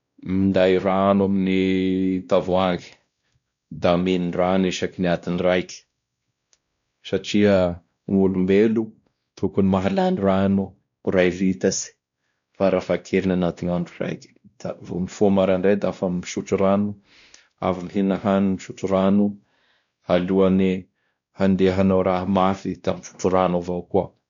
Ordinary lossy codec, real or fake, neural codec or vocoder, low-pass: none; fake; codec, 16 kHz, 1 kbps, X-Codec, WavLM features, trained on Multilingual LibriSpeech; 7.2 kHz